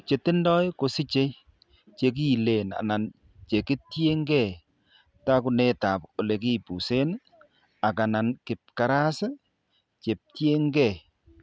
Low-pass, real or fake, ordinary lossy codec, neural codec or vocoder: none; real; none; none